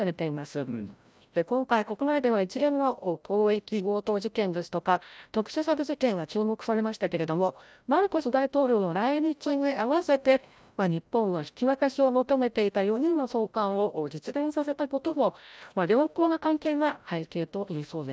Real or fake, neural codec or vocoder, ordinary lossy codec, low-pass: fake; codec, 16 kHz, 0.5 kbps, FreqCodec, larger model; none; none